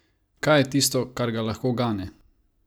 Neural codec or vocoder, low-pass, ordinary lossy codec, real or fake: none; none; none; real